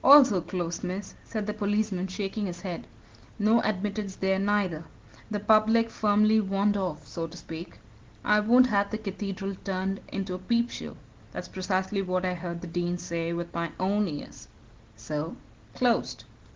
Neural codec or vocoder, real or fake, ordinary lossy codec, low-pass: none; real; Opus, 16 kbps; 7.2 kHz